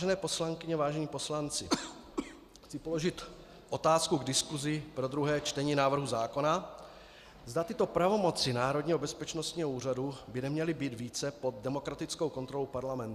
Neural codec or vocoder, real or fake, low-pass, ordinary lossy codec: none; real; 14.4 kHz; Opus, 64 kbps